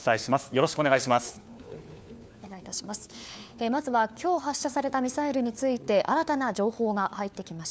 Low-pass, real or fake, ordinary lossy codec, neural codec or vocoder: none; fake; none; codec, 16 kHz, 4 kbps, FunCodec, trained on LibriTTS, 50 frames a second